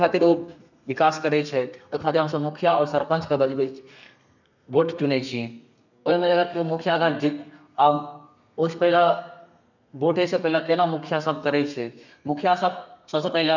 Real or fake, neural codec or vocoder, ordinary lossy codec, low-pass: fake; codec, 32 kHz, 1.9 kbps, SNAC; none; 7.2 kHz